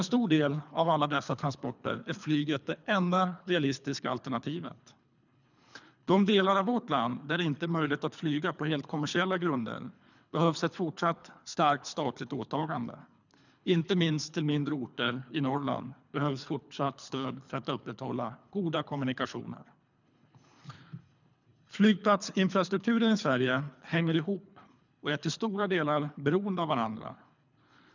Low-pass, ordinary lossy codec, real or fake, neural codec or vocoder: 7.2 kHz; none; fake; codec, 24 kHz, 3 kbps, HILCodec